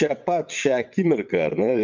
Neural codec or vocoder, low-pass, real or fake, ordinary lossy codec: vocoder, 22.05 kHz, 80 mel bands, WaveNeXt; 7.2 kHz; fake; MP3, 64 kbps